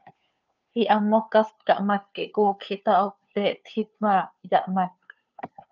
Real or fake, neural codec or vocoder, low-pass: fake; codec, 16 kHz, 2 kbps, FunCodec, trained on Chinese and English, 25 frames a second; 7.2 kHz